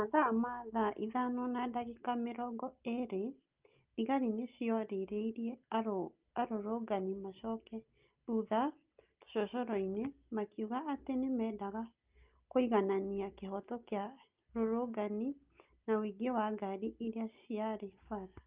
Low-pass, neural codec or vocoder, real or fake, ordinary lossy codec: 3.6 kHz; none; real; Opus, 24 kbps